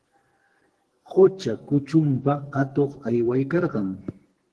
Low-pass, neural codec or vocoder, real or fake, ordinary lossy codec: 10.8 kHz; codec, 44.1 kHz, 2.6 kbps, SNAC; fake; Opus, 16 kbps